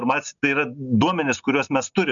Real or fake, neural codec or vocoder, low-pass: real; none; 7.2 kHz